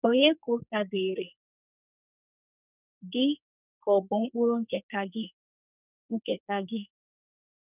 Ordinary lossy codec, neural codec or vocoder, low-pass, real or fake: none; codec, 44.1 kHz, 2.6 kbps, SNAC; 3.6 kHz; fake